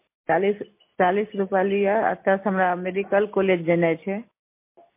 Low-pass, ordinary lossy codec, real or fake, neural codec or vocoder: 3.6 kHz; MP3, 24 kbps; real; none